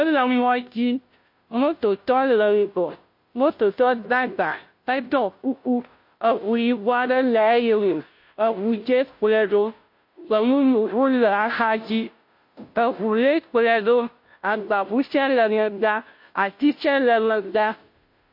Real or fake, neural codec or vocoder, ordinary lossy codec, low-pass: fake; codec, 16 kHz, 0.5 kbps, FunCodec, trained on Chinese and English, 25 frames a second; MP3, 32 kbps; 5.4 kHz